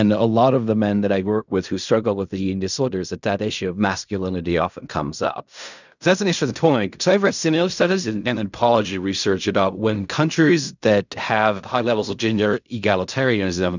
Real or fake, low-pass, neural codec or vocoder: fake; 7.2 kHz; codec, 16 kHz in and 24 kHz out, 0.4 kbps, LongCat-Audio-Codec, fine tuned four codebook decoder